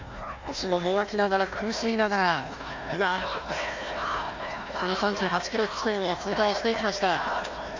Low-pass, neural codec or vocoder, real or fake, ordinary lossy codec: 7.2 kHz; codec, 16 kHz, 1 kbps, FunCodec, trained on Chinese and English, 50 frames a second; fake; MP3, 48 kbps